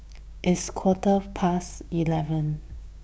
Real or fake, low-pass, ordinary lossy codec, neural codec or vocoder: fake; none; none; codec, 16 kHz, 6 kbps, DAC